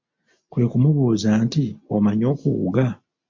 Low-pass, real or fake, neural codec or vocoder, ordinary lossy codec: 7.2 kHz; real; none; MP3, 48 kbps